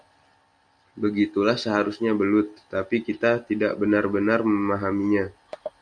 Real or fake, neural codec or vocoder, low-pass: real; none; 9.9 kHz